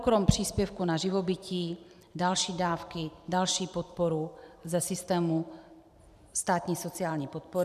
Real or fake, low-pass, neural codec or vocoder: fake; 14.4 kHz; vocoder, 44.1 kHz, 128 mel bands every 512 samples, BigVGAN v2